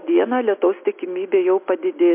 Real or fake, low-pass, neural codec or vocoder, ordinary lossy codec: real; 3.6 kHz; none; MP3, 32 kbps